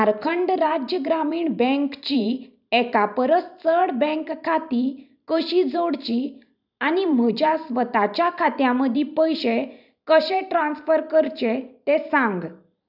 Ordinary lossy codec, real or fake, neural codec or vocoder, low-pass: none; fake; vocoder, 44.1 kHz, 128 mel bands every 512 samples, BigVGAN v2; 5.4 kHz